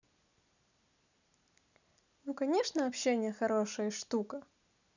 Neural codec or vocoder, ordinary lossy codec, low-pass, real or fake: none; none; 7.2 kHz; real